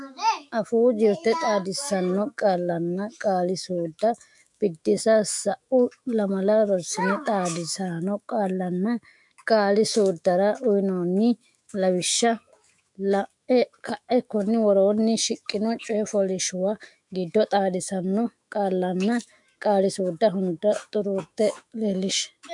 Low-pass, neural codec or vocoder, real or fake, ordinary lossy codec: 10.8 kHz; autoencoder, 48 kHz, 128 numbers a frame, DAC-VAE, trained on Japanese speech; fake; MP3, 64 kbps